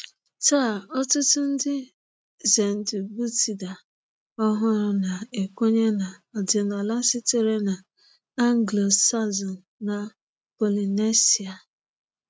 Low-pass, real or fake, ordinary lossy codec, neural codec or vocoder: none; real; none; none